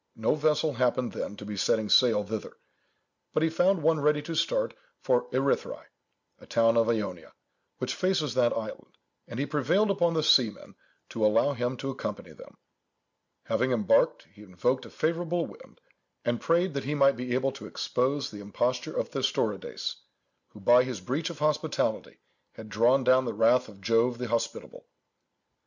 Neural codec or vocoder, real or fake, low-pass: none; real; 7.2 kHz